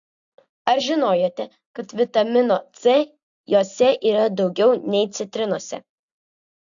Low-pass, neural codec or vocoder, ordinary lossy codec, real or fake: 7.2 kHz; none; AAC, 64 kbps; real